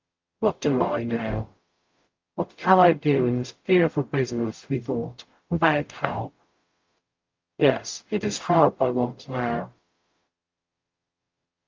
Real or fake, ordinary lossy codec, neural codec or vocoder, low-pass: fake; Opus, 24 kbps; codec, 44.1 kHz, 0.9 kbps, DAC; 7.2 kHz